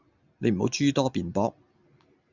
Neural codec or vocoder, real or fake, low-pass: none; real; 7.2 kHz